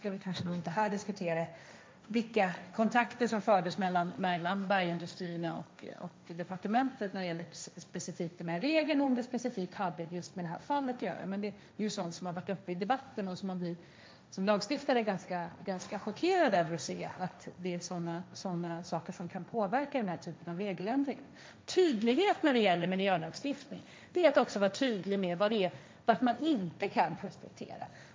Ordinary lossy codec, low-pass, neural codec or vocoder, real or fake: none; none; codec, 16 kHz, 1.1 kbps, Voila-Tokenizer; fake